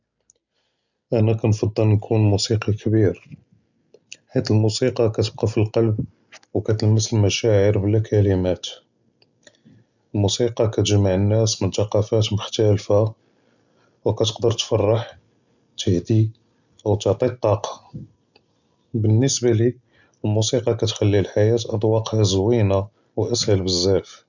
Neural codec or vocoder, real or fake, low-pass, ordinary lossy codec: none; real; 7.2 kHz; none